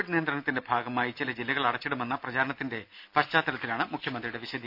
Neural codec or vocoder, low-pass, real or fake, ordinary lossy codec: none; 5.4 kHz; real; none